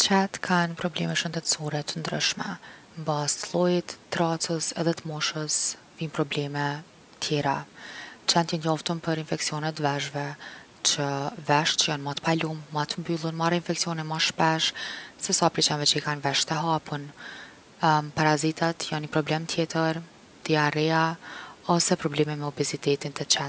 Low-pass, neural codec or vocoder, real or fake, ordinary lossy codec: none; none; real; none